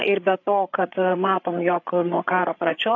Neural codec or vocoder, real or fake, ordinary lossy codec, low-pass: codec, 16 kHz, 8 kbps, FreqCodec, larger model; fake; AAC, 48 kbps; 7.2 kHz